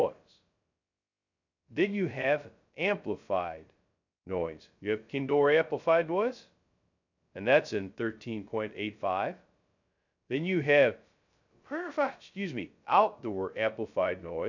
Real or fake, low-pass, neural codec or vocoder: fake; 7.2 kHz; codec, 16 kHz, 0.2 kbps, FocalCodec